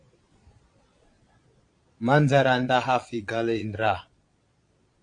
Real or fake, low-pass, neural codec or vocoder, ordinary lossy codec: fake; 9.9 kHz; vocoder, 22.05 kHz, 80 mel bands, Vocos; AAC, 48 kbps